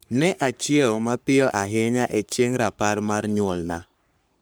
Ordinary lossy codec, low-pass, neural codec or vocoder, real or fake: none; none; codec, 44.1 kHz, 3.4 kbps, Pupu-Codec; fake